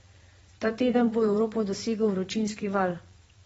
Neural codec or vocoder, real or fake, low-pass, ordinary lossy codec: vocoder, 22.05 kHz, 80 mel bands, Vocos; fake; 9.9 kHz; AAC, 24 kbps